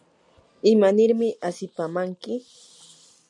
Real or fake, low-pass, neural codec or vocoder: real; 10.8 kHz; none